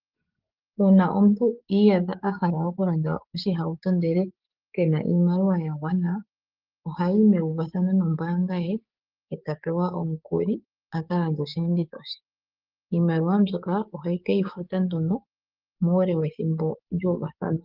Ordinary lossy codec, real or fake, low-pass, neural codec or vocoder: Opus, 32 kbps; fake; 5.4 kHz; codec, 16 kHz, 6 kbps, DAC